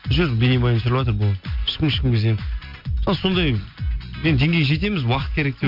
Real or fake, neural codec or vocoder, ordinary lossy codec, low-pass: real; none; MP3, 48 kbps; 5.4 kHz